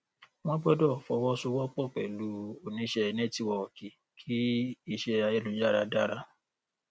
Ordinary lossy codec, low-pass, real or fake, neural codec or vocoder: none; none; real; none